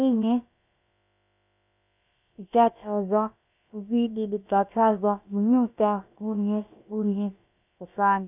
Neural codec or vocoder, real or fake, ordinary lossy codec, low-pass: codec, 16 kHz, about 1 kbps, DyCAST, with the encoder's durations; fake; none; 3.6 kHz